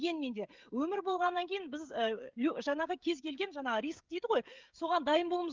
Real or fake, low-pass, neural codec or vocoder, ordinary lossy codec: fake; 7.2 kHz; codec, 16 kHz, 16 kbps, FreqCodec, smaller model; Opus, 24 kbps